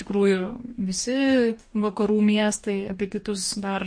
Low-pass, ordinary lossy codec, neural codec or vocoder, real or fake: 9.9 kHz; MP3, 48 kbps; codec, 44.1 kHz, 2.6 kbps, DAC; fake